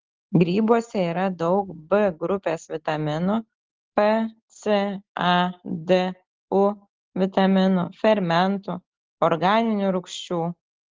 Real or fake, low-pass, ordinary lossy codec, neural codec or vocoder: real; 7.2 kHz; Opus, 16 kbps; none